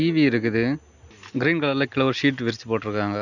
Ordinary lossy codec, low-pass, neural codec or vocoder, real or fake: none; 7.2 kHz; none; real